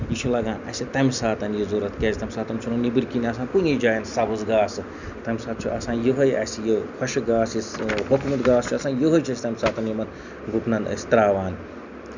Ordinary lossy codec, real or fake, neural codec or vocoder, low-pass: none; real; none; 7.2 kHz